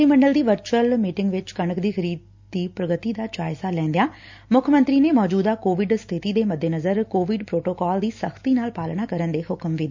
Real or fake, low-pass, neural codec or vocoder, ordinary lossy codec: real; 7.2 kHz; none; MP3, 64 kbps